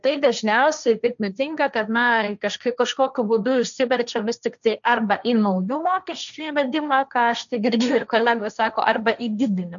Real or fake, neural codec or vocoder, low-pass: fake; codec, 16 kHz, 1.1 kbps, Voila-Tokenizer; 7.2 kHz